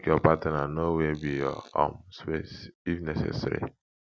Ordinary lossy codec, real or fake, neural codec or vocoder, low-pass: none; real; none; none